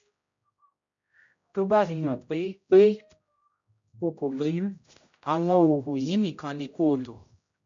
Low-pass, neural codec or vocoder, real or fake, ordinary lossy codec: 7.2 kHz; codec, 16 kHz, 0.5 kbps, X-Codec, HuBERT features, trained on general audio; fake; MP3, 48 kbps